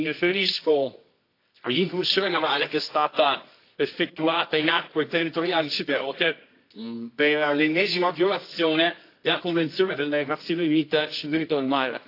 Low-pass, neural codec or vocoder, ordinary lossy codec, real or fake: 5.4 kHz; codec, 24 kHz, 0.9 kbps, WavTokenizer, medium music audio release; AAC, 32 kbps; fake